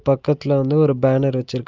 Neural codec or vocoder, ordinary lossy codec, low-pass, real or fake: none; Opus, 32 kbps; 7.2 kHz; real